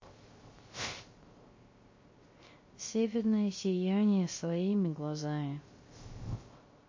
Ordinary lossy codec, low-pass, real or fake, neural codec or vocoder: MP3, 32 kbps; 7.2 kHz; fake; codec, 16 kHz, 0.3 kbps, FocalCodec